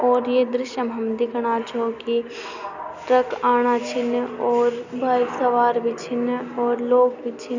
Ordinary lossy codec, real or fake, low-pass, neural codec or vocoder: none; real; 7.2 kHz; none